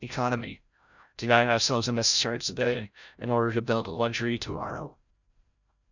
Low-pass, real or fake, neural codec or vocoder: 7.2 kHz; fake; codec, 16 kHz, 0.5 kbps, FreqCodec, larger model